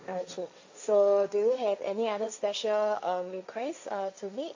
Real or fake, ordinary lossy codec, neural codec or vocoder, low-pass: fake; AAC, 48 kbps; codec, 16 kHz, 1.1 kbps, Voila-Tokenizer; 7.2 kHz